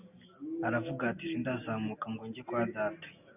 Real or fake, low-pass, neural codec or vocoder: real; 3.6 kHz; none